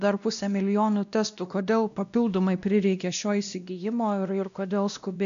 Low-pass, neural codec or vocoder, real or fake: 7.2 kHz; codec, 16 kHz, 1 kbps, X-Codec, WavLM features, trained on Multilingual LibriSpeech; fake